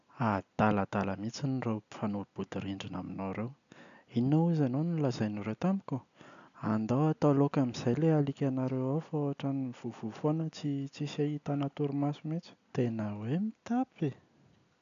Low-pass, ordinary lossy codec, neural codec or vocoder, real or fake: 7.2 kHz; none; none; real